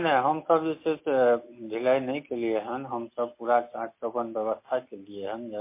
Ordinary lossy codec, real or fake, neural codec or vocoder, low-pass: MP3, 24 kbps; real; none; 3.6 kHz